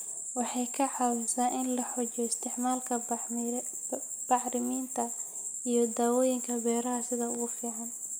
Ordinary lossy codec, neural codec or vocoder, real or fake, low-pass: none; none; real; none